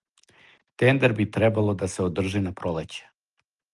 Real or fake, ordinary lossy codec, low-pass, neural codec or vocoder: real; Opus, 32 kbps; 10.8 kHz; none